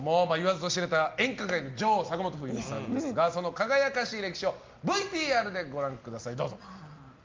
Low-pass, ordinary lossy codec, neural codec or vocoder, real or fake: 7.2 kHz; Opus, 24 kbps; none; real